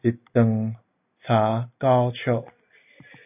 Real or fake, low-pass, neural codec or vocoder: real; 3.6 kHz; none